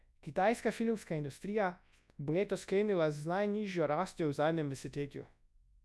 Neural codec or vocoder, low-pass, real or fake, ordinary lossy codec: codec, 24 kHz, 0.9 kbps, WavTokenizer, large speech release; none; fake; none